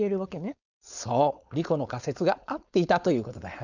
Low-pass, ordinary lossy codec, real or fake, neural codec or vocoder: 7.2 kHz; none; fake; codec, 16 kHz, 4.8 kbps, FACodec